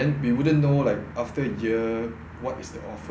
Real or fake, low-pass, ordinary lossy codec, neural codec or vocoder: real; none; none; none